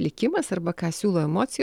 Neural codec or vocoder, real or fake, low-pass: none; real; 19.8 kHz